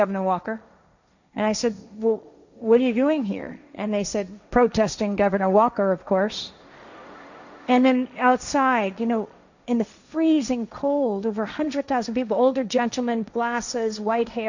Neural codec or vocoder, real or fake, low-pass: codec, 16 kHz, 1.1 kbps, Voila-Tokenizer; fake; 7.2 kHz